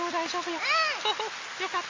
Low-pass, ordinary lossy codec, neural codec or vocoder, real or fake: 7.2 kHz; MP3, 32 kbps; none; real